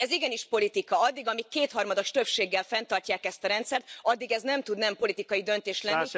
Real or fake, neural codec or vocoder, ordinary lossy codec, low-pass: real; none; none; none